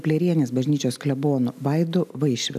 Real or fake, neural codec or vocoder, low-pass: real; none; 14.4 kHz